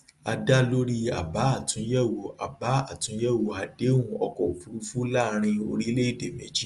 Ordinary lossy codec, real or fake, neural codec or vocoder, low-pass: Opus, 32 kbps; real; none; 10.8 kHz